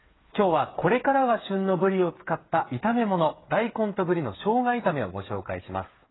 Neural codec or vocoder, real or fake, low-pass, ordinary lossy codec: codec, 16 kHz, 8 kbps, FreqCodec, smaller model; fake; 7.2 kHz; AAC, 16 kbps